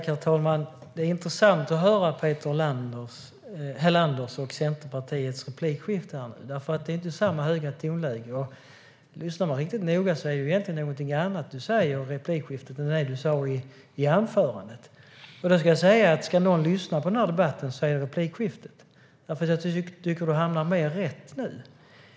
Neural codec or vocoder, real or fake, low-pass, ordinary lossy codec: none; real; none; none